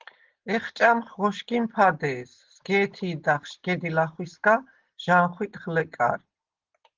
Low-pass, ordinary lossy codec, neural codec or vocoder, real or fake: 7.2 kHz; Opus, 16 kbps; none; real